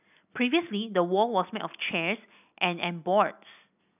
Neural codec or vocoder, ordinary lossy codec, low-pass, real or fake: none; none; 3.6 kHz; real